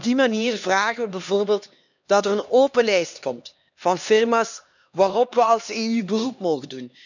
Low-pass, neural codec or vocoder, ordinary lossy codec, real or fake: 7.2 kHz; codec, 16 kHz, 2 kbps, X-Codec, HuBERT features, trained on LibriSpeech; none; fake